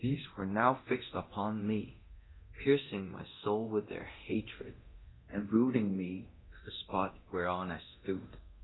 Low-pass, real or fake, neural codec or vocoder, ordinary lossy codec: 7.2 kHz; fake; codec, 24 kHz, 0.9 kbps, DualCodec; AAC, 16 kbps